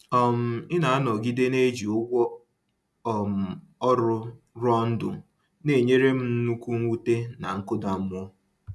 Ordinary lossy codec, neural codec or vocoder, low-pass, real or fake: none; none; none; real